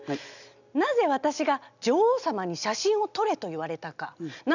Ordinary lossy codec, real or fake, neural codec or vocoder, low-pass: none; real; none; 7.2 kHz